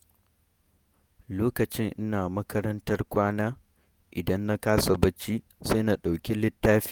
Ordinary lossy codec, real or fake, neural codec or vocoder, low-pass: none; fake; vocoder, 48 kHz, 128 mel bands, Vocos; none